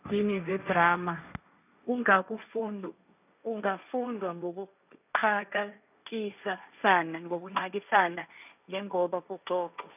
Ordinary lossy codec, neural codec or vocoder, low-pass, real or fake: none; codec, 16 kHz, 1.1 kbps, Voila-Tokenizer; 3.6 kHz; fake